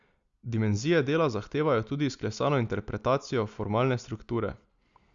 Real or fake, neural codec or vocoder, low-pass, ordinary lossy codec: real; none; 7.2 kHz; Opus, 64 kbps